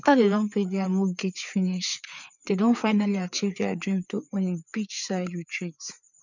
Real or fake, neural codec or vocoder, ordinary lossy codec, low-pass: fake; codec, 16 kHz, 4 kbps, FreqCodec, larger model; none; 7.2 kHz